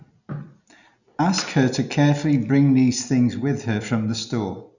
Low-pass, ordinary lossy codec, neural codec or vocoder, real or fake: 7.2 kHz; none; none; real